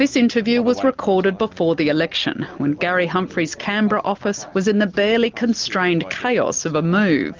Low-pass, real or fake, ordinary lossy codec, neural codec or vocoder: 7.2 kHz; real; Opus, 32 kbps; none